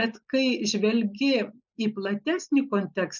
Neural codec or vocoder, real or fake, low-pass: none; real; 7.2 kHz